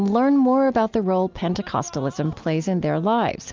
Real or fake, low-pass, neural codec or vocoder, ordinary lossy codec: real; 7.2 kHz; none; Opus, 32 kbps